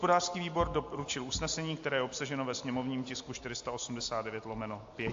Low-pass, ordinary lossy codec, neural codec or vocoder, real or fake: 7.2 kHz; AAC, 48 kbps; none; real